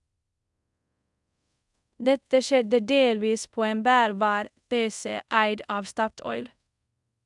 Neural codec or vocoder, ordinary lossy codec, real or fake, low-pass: codec, 24 kHz, 0.5 kbps, DualCodec; MP3, 96 kbps; fake; 10.8 kHz